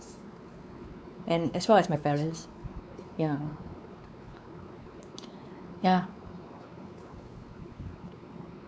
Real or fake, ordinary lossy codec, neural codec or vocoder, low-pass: fake; none; codec, 16 kHz, 4 kbps, X-Codec, WavLM features, trained on Multilingual LibriSpeech; none